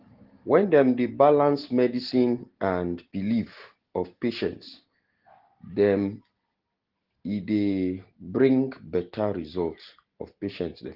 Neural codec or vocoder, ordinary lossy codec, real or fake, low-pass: none; Opus, 16 kbps; real; 5.4 kHz